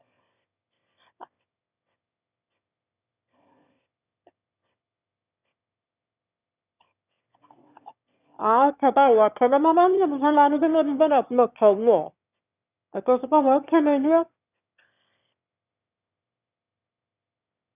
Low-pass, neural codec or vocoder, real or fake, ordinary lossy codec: 3.6 kHz; autoencoder, 22.05 kHz, a latent of 192 numbers a frame, VITS, trained on one speaker; fake; none